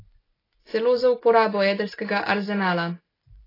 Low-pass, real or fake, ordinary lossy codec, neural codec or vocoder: 5.4 kHz; real; AAC, 24 kbps; none